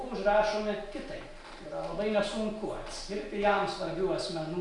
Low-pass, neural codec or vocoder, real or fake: 10.8 kHz; none; real